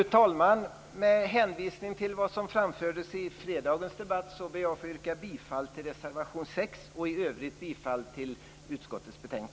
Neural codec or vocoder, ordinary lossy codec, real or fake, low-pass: none; none; real; none